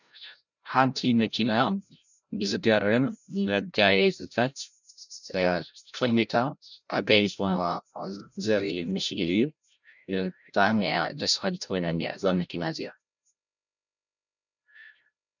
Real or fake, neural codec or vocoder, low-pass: fake; codec, 16 kHz, 0.5 kbps, FreqCodec, larger model; 7.2 kHz